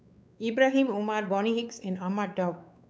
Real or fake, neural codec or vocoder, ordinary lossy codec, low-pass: fake; codec, 16 kHz, 4 kbps, X-Codec, WavLM features, trained on Multilingual LibriSpeech; none; none